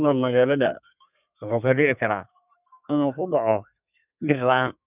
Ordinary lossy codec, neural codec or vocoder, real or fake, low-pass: none; codec, 32 kHz, 1.9 kbps, SNAC; fake; 3.6 kHz